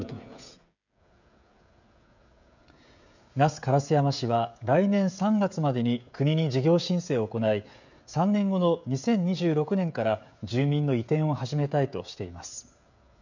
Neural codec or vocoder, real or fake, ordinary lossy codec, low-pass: codec, 16 kHz, 16 kbps, FreqCodec, smaller model; fake; none; 7.2 kHz